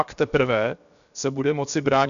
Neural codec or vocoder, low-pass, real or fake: codec, 16 kHz, 0.7 kbps, FocalCodec; 7.2 kHz; fake